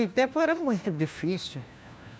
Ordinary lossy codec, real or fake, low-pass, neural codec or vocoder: none; fake; none; codec, 16 kHz, 1 kbps, FunCodec, trained on LibriTTS, 50 frames a second